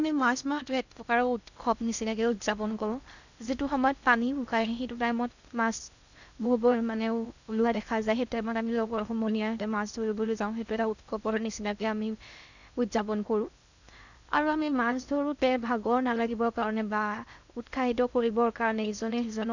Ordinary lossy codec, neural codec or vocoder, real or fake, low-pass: none; codec, 16 kHz in and 24 kHz out, 0.6 kbps, FocalCodec, streaming, 2048 codes; fake; 7.2 kHz